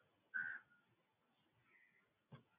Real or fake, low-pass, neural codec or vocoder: real; 3.6 kHz; none